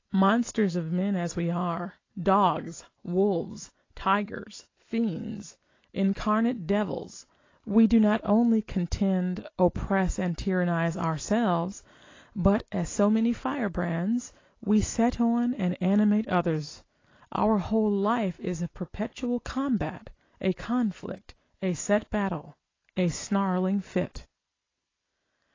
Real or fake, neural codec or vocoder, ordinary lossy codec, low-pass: real; none; AAC, 32 kbps; 7.2 kHz